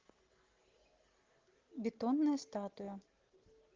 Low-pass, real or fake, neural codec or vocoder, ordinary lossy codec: 7.2 kHz; real; none; Opus, 16 kbps